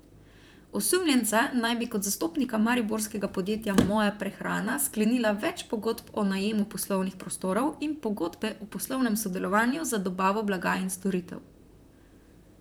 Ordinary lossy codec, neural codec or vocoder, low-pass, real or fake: none; vocoder, 44.1 kHz, 128 mel bands, Pupu-Vocoder; none; fake